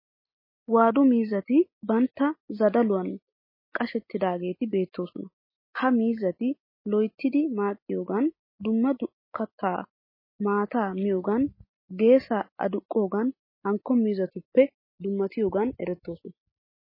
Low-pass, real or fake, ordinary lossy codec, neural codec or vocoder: 5.4 kHz; real; MP3, 24 kbps; none